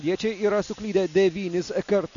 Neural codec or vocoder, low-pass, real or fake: none; 7.2 kHz; real